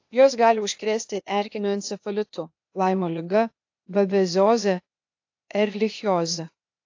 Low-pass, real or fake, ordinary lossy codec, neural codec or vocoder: 7.2 kHz; fake; AAC, 48 kbps; codec, 16 kHz, 0.8 kbps, ZipCodec